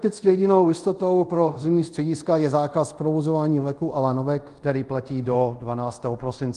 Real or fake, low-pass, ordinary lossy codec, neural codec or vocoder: fake; 10.8 kHz; Opus, 24 kbps; codec, 24 kHz, 0.5 kbps, DualCodec